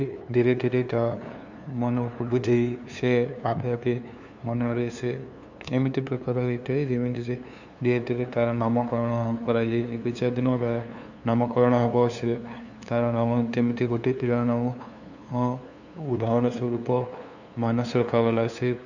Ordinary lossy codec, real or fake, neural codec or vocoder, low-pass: none; fake; codec, 16 kHz, 2 kbps, FunCodec, trained on LibriTTS, 25 frames a second; 7.2 kHz